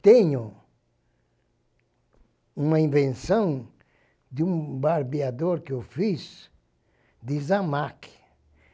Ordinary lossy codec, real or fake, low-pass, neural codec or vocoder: none; real; none; none